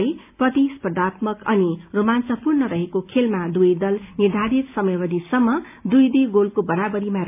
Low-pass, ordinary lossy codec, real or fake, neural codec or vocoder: 3.6 kHz; AAC, 32 kbps; real; none